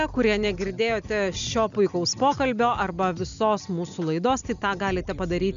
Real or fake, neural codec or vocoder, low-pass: real; none; 7.2 kHz